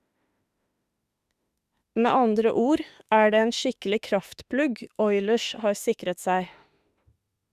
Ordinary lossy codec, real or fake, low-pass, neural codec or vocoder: Opus, 64 kbps; fake; 14.4 kHz; autoencoder, 48 kHz, 32 numbers a frame, DAC-VAE, trained on Japanese speech